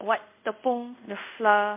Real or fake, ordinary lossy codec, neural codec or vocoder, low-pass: fake; MP3, 32 kbps; codec, 24 kHz, 0.5 kbps, DualCodec; 3.6 kHz